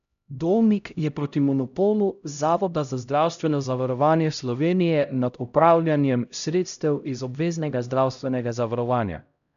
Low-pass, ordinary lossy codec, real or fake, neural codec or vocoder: 7.2 kHz; none; fake; codec, 16 kHz, 0.5 kbps, X-Codec, HuBERT features, trained on LibriSpeech